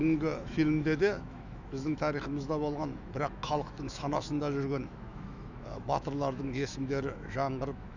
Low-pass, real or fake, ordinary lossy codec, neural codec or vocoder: 7.2 kHz; fake; none; autoencoder, 48 kHz, 128 numbers a frame, DAC-VAE, trained on Japanese speech